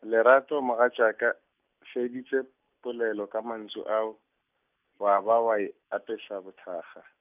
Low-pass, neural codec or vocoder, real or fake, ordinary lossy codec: 3.6 kHz; none; real; none